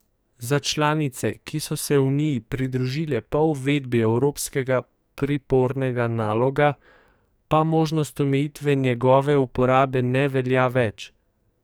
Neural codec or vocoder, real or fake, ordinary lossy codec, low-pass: codec, 44.1 kHz, 2.6 kbps, SNAC; fake; none; none